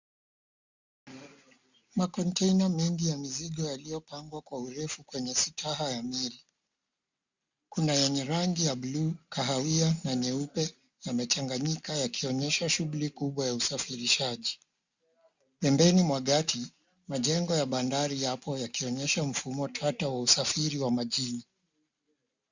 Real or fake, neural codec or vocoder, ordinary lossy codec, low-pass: real; none; Opus, 64 kbps; 7.2 kHz